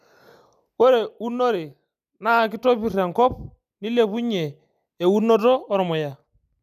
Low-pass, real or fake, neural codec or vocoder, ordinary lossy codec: 14.4 kHz; real; none; none